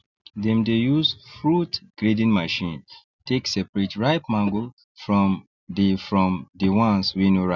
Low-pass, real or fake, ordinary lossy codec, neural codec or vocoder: 7.2 kHz; real; none; none